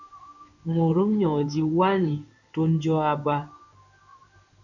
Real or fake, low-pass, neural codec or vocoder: fake; 7.2 kHz; codec, 16 kHz in and 24 kHz out, 1 kbps, XY-Tokenizer